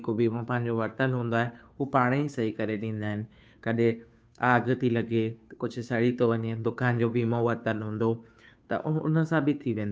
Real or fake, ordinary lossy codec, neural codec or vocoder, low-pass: fake; none; codec, 16 kHz, 2 kbps, FunCodec, trained on Chinese and English, 25 frames a second; none